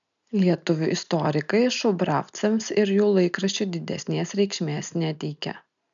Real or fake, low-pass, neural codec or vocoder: real; 7.2 kHz; none